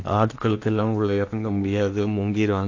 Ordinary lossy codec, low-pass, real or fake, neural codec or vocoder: AAC, 48 kbps; 7.2 kHz; fake; codec, 16 kHz in and 24 kHz out, 0.8 kbps, FocalCodec, streaming, 65536 codes